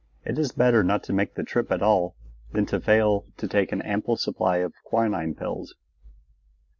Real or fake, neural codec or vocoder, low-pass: real; none; 7.2 kHz